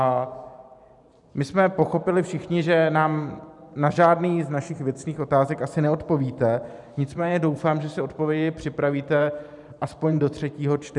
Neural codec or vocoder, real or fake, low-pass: vocoder, 44.1 kHz, 128 mel bands every 512 samples, BigVGAN v2; fake; 10.8 kHz